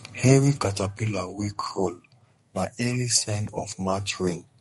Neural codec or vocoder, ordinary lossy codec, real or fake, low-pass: codec, 32 kHz, 1.9 kbps, SNAC; MP3, 48 kbps; fake; 14.4 kHz